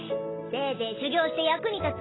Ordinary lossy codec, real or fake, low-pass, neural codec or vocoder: AAC, 16 kbps; real; 7.2 kHz; none